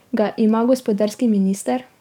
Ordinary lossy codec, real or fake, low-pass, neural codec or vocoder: none; fake; 19.8 kHz; codec, 44.1 kHz, 7.8 kbps, DAC